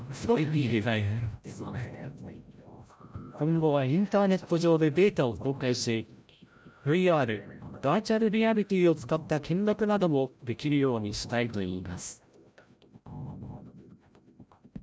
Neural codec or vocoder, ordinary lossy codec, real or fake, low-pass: codec, 16 kHz, 0.5 kbps, FreqCodec, larger model; none; fake; none